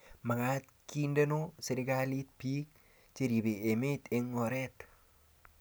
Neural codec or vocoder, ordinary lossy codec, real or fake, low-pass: none; none; real; none